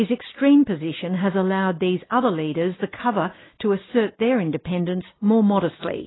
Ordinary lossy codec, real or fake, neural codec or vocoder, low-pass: AAC, 16 kbps; real; none; 7.2 kHz